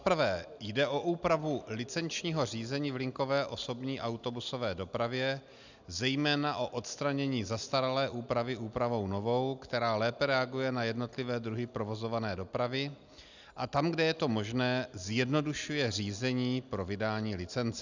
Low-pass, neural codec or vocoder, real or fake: 7.2 kHz; none; real